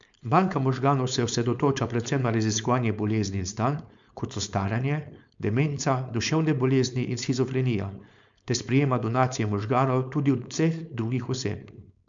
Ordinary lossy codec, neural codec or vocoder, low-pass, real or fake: MP3, 64 kbps; codec, 16 kHz, 4.8 kbps, FACodec; 7.2 kHz; fake